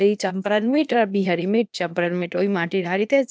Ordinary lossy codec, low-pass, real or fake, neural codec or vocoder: none; none; fake; codec, 16 kHz, 0.8 kbps, ZipCodec